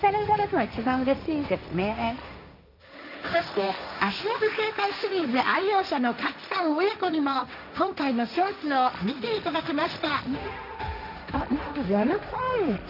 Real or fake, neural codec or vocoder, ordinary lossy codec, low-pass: fake; codec, 16 kHz, 1.1 kbps, Voila-Tokenizer; none; 5.4 kHz